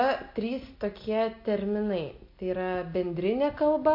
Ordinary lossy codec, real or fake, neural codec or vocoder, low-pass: MP3, 32 kbps; real; none; 5.4 kHz